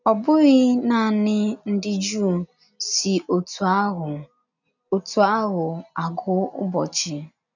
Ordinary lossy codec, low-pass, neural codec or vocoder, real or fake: none; 7.2 kHz; none; real